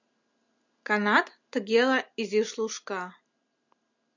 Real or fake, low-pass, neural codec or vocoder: real; 7.2 kHz; none